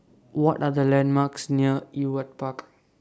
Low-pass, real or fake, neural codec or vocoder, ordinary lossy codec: none; real; none; none